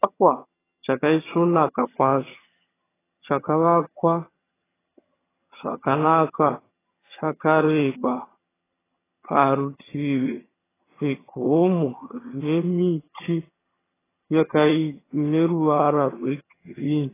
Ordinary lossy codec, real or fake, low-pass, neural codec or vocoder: AAC, 16 kbps; fake; 3.6 kHz; vocoder, 22.05 kHz, 80 mel bands, HiFi-GAN